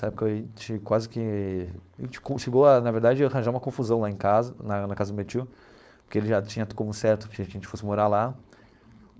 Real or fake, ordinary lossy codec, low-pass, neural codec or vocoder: fake; none; none; codec, 16 kHz, 4.8 kbps, FACodec